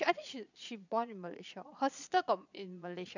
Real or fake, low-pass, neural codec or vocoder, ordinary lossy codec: fake; 7.2 kHz; vocoder, 22.05 kHz, 80 mel bands, Vocos; none